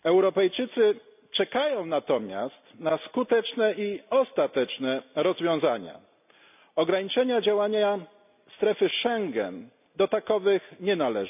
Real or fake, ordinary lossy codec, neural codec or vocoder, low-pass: real; none; none; 3.6 kHz